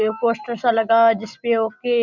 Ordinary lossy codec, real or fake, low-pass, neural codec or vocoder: none; real; 7.2 kHz; none